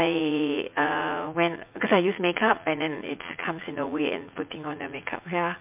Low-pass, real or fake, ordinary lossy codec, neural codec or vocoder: 3.6 kHz; fake; MP3, 32 kbps; vocoder, 44.1 kHz, 80 mel bands, Vocos